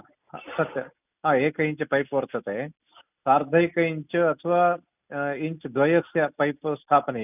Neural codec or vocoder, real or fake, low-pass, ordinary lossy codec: none; real; 3.6 kHz; none